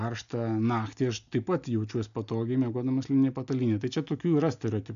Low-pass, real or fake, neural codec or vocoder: 7.2 kHz; real; none